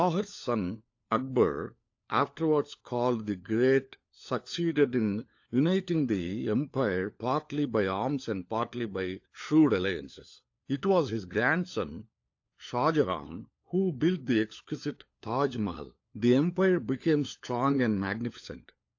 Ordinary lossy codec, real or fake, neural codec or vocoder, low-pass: AAC, 48 kbps; fake; codec, 16 kHz, 4 kbps, FunCodec, trained on LibriTTS, 50 frames a second; 7.2 kHz